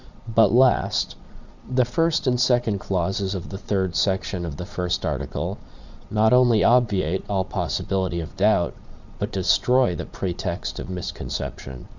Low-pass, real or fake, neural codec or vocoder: 7.2 kHz; fake; vocoder, 22.05 kHz, 80 mel bands, Vocos